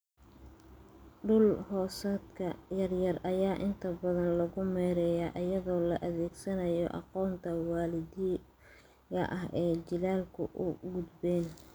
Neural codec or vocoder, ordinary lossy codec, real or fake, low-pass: none; none; real; none